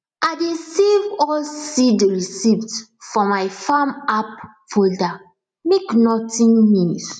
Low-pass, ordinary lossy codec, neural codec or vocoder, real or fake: 9.9 kHz; none; none; real